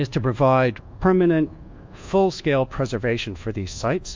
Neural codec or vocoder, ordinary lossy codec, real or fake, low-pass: codec, 24 kHz, 1.2 kbps, DualCodec; MP3, 48 kbps; fake; 7.2 kHz